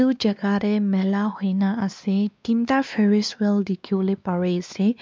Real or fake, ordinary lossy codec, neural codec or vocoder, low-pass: fake; none; codec, 16 kHz, 2 kbps, X-Codec, WavLM features, trained on Multilingual LibriSpeech; none